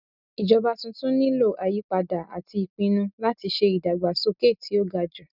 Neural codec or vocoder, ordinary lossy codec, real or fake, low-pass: none; none; real; 5.4 kHz